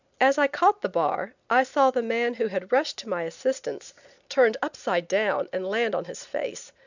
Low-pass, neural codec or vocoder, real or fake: 7.2 kHz; none; real